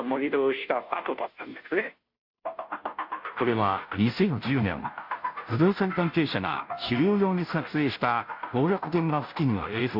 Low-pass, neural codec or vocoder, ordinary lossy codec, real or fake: 5.4 kHz; codec, 16 kHz, 0.5 kbps, FunCodec, trained on Chinese and English, 25 frames a second; AAC, 48 kbps; fake